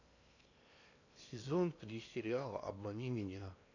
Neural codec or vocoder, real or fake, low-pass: codec, 16 kHz in and 24 kHz out, 0.8 kbps, FocalCodec, streaming, 65536 codes; fake; 7.2 kHz